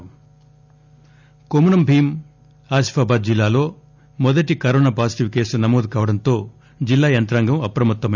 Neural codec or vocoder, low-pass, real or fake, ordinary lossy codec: none; 7.2 kHz; real; none